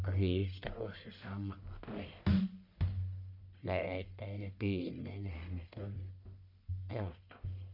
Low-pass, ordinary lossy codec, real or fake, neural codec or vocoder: 5.4 kHz; none; fake; codec, 44.1 kHz, 1.7 kbps, Pupu-Codec